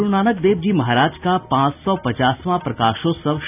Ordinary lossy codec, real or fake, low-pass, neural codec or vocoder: none; real; 3.6 kHz; none